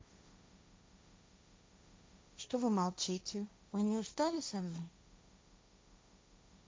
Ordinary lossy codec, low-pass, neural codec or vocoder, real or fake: none; none; codec, 16 kHz, 1.1 kbps, Voila-Tokenizer; fake